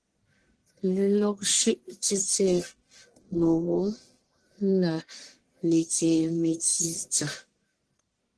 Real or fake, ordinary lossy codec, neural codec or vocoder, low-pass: fake; Opus, 16 kbps; codec, 44.1 kHz, 1.7 kbps, Pupu-Codec; 10.8 kHz